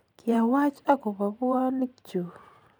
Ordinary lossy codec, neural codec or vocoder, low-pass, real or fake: none; vocoder, 44.1 kHz, 128 mel bands every 512 samples, BigVGAN v2; none; fake